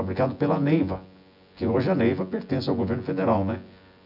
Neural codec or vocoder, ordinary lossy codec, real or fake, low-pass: vocoder, 24 kHz, 100 mel bands, Vocos; none; fake; 5.4 kHz